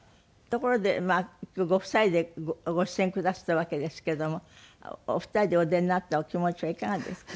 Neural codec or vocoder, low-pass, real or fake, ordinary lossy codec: none; none; real; none